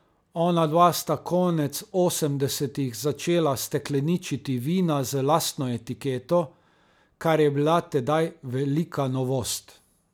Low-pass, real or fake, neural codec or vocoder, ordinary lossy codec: none; real; none; none